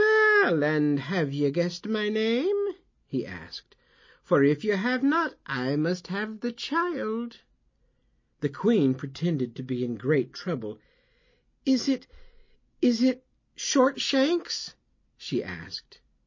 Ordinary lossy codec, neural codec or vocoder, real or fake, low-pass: MP3, 32 kbps; none; real; 7.2 kHz